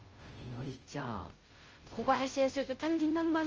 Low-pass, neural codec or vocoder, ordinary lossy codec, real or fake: 7.2 kHz; codec, 16 kHz, 0.5 kbps, FunCodec, trained on Chinese and English, 25 frames a second; Opus, 24 kbps; fake